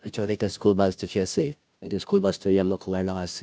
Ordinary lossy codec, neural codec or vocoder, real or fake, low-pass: none; codec, 16 kHz, 0.5 kbps, FunCodec, trained on Chinese and English, 25 frames a second; fake; none